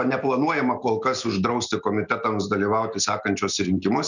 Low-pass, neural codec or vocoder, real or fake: 7.2 kHz; none; real